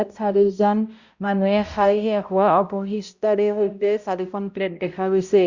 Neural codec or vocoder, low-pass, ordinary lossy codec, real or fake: codec, 16 kHz, 0.5 kbps, X-Codec, HuBERT features, trained on balanced general audio; 7.2 kHz; Opus, 64 kbps; fake